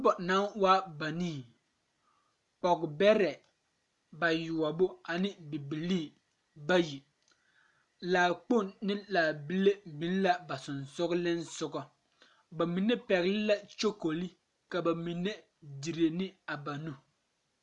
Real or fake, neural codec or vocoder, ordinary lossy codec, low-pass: real; none; MP3, 96 kbps; 10.8 kHz